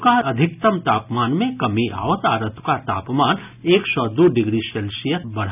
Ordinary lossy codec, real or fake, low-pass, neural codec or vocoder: none; real; 3.6 kHz; none